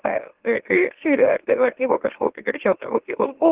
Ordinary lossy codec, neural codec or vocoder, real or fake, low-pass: Opus, 16 kbps; autoencoder, 44.1 kHz, a latent of 192 numbers a frame, MeloTTS; fake; 3.6 kHz